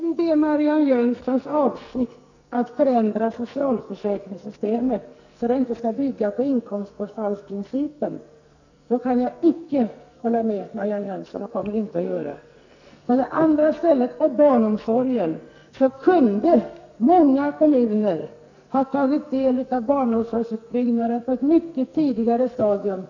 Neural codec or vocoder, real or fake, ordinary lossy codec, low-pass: codec, 44.1 kHz, 2.6 kbps, SNAC; fake; none; 7.2 kHz